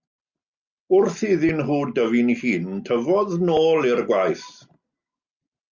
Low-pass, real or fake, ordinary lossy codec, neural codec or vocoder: 7.2 kHz; real; Opus, 64 kbps; none